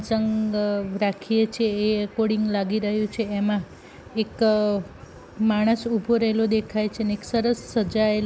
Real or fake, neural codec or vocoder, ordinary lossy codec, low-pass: real; none; none; none